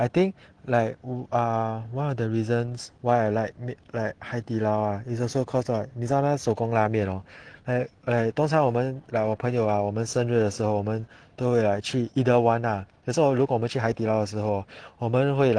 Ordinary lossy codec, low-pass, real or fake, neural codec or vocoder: Opus, 16 kbps; 9.9 kHz; real; none